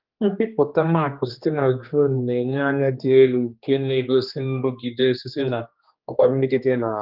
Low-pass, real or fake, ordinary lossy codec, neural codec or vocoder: 5.4 kHz; fake; Opus, 32 kbps; codec, 16 kHz, 2 kbps, X-Codec, HuBERT features, trained on general audio